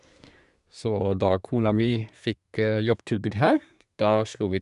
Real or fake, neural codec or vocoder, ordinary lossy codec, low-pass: fake; codec, 24 kHz, 1 kbps, SNAC; none; 10.8 kHz